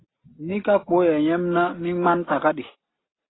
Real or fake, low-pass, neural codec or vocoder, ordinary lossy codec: real; 7.2 kHz; none; AAC, 16 kbps